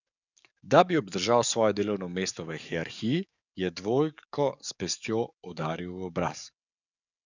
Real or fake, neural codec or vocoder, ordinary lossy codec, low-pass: fake; codec, 44.1 kHz, 7.8 kbps, DAC; none; 7.2 kHz